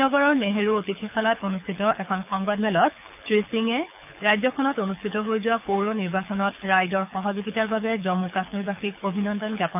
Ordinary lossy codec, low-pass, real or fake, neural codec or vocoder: none; 3.6 kHz; fake; codec, 24 kHz, 6 kbps, HILCodec